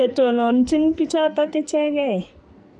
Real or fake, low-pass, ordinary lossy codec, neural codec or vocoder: fake; 10.8 kHz; none; codec, 44.1 kHz, 2.6 kbps, SNAC